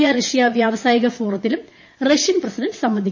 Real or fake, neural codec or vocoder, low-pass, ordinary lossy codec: fake; vocoder, 22.05 kHz, 80 mel bands, Vocos; 7.2 kHz; MP3, 32 kbps